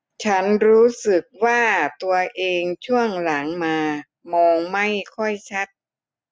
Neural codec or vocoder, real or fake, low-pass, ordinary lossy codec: none; real; none; none